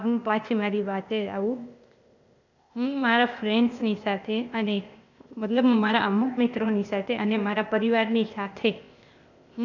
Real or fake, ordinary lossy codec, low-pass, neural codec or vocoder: fake; none; 7.2 kHz; codec, 16 kHz, 0.8 kbps, ZipCodec